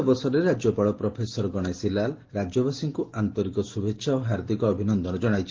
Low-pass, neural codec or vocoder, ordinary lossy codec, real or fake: 7.2 kHz; none; Opus, 16 kbps; real